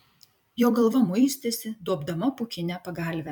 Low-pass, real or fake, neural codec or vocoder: 19.8 kHz; fake; vocoder, 44.1 kHz, 128 mel bands every 256 samples, BigVGAN v2